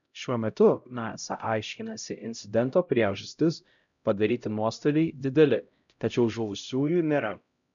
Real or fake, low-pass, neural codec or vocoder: fake; 7.2 kHz; codec, 16 kHz, 0.5 kbps, X-Codec, HuBERT features, trained on LibriSpeech